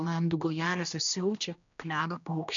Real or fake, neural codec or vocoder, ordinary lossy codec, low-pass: fake; codec, 16 kHz, 1 kbps, X-Codec, HuBERT features, trained on general audio; MP3, 96 kbps; 7.2 kHz